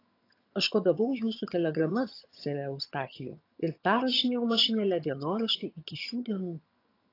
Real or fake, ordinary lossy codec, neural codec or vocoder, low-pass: fake; AAC, 32 kbps; vocoder, 22.05 kHz, 80 mel bands, HiFi-GAN; 5.4 kHz